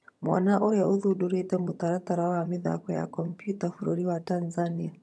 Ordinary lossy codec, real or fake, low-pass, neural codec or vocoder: none; fake; none; vocoder, 22.05 kHz, 80 mel bands, HiFi-GAN